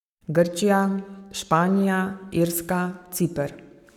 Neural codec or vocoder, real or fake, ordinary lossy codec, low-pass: codec, 44.1 kHz, 7.8 kbps, Pupu-Codec; fake; none; 19.8 kHz